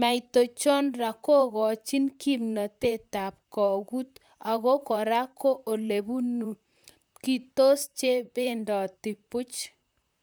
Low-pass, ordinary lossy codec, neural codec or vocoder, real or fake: none; none; vocoder, 44.1 kHz, 128 mel bands, Pupu-Vocoder; fake